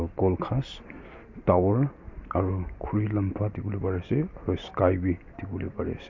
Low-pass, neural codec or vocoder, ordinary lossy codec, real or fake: 7.2 kHz; none; MP3, 48 kbps; real